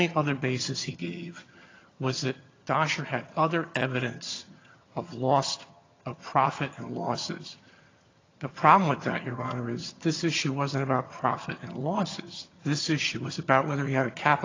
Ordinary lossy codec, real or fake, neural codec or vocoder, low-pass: AAC, 32 kbps; fake; vocoder, 22.05 kHz, 80 mel bands, HiFi-GAN; 7.2 kHz